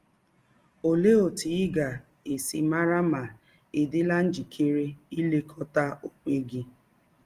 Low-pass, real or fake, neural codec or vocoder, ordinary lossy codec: 14.4 kHz; real; none; Opus, 24 kbps